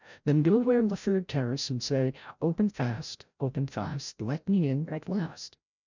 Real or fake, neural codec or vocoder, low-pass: fake; codec, 16 kHz, 0.5 kbps, FreqCodec, larger model; 7.2 kHz